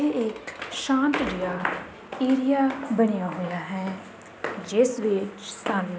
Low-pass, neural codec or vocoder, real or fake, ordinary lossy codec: none; none; real; none